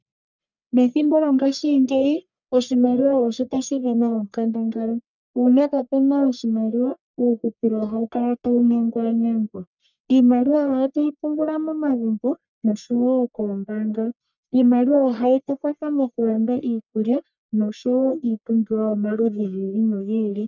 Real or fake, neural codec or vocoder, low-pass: fake; codec, 44.1 kHz, 1.7 kbps, Pupu-Codec; 7.2 kHz